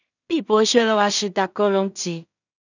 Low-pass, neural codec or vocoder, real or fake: 7.2 kHz; codec, 16 kHz in and 24 kHz out, 0.4 kbps, LongCat-Audio-Codec, two codebook decoder; fake